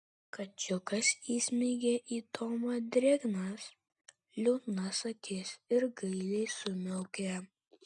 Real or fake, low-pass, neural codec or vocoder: real; 10.8 kHz; none